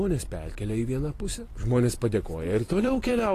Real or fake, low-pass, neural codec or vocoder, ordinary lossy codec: fake; 14.4 kHz; vocoder, 44.1 kHz, 128 mel bands every 256 samples, BigVGAN v2; AAC, 48 kbps